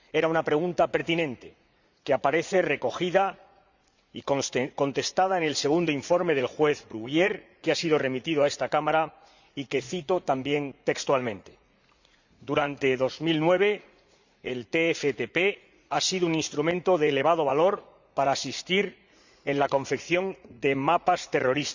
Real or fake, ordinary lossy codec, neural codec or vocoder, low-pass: fake; Opus, 64 kbps; vocoder, 44.1 kHz, 80 mel bands, Vocos; 7.2 kHz